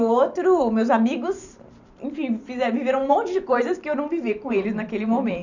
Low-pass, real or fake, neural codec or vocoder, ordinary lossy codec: 7.2 kHz; fake; vocoder, 44.1 kHz, 128 mel bands every 512 samples, BigVGAN v2; none